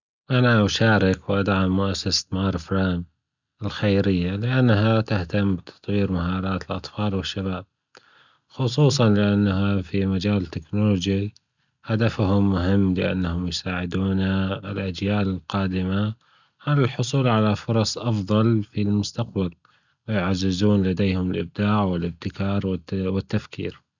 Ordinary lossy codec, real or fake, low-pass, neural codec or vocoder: none; real; 7.2 kHz; none